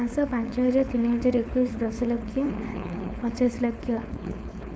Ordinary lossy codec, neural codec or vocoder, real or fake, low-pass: none; codec, 16 kHz, 4.8 kbps, FACodec; fake; none